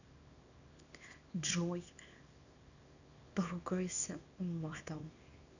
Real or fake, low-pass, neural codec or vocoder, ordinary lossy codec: fake; 7.2 kHz; codec, 24 kHz, 0.9 kbps, WavTokenizer, small release; none